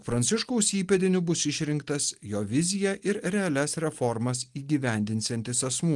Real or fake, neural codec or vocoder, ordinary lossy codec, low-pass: real; none; Opus, 24 kbps; 10.8 kHz